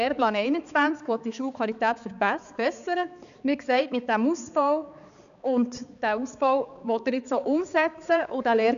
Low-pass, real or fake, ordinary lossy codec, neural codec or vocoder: 7.2 kHz; fake; none; codec, 16 kHz, 4 kbps, X-Codec, HuBERT features, trained on balanced general audio